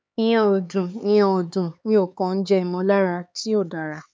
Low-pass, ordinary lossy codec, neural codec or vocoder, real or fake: none; none; codec, 16 kHz, 4 kbps, X-Codec, HuBERT features, trained on LibriSpeech; fake